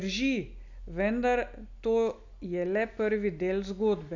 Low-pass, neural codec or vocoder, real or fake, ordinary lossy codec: 7.2 kHz; none; real; none